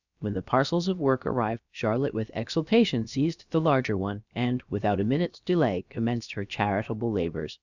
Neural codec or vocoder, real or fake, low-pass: codec, 16 kHz, about 1 kbps, DyCAST, with the encoder's durations; fake; 7.2 kHz